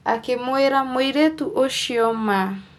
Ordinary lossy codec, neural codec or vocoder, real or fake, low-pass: none; none; real; 19.8 kHz